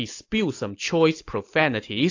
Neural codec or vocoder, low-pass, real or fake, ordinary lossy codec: none; 7.2 kHz; real; AAC, 48 kbps